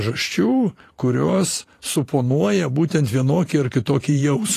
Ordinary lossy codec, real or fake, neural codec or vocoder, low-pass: AAC, 48 kbps; fake; vocoder, 44.1 kHz, 128 mel bands every 512 samples, BigVGAN v2; 14.4 kHz